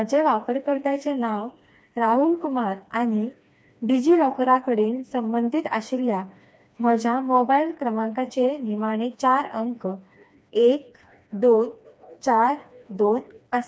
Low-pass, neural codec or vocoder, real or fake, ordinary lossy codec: none; codec, 16 kHz, 2 kbps, FreqCodec, smaller model; fake; none